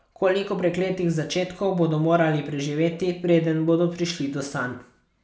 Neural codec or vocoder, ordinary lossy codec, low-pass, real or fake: none; none; none; real